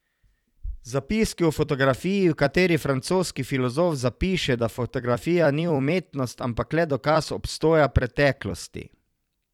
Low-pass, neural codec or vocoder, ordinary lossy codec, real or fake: 19.8 kHz; vocoder, 44.1 kHz, 128 mel bands every 256 samples, BigVGAN v2; none; fake